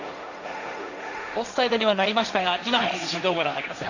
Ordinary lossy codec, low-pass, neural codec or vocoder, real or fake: none; 7.2 kHz; codec, 16 kHz, 1.1 kbps, Voila-Tokenizer; fake